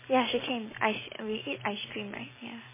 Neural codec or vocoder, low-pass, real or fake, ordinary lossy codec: none; 3.6 kHz; real; MP3, 16 kbps